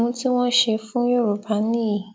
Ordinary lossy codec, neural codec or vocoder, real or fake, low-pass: none; none; real; none